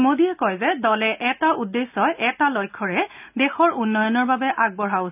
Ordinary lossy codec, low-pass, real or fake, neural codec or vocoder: none; 3.6 kHz; real; none